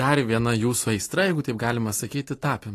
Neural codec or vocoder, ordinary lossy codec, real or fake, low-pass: none; AAC, 48 kbps; real; 14.4 kHz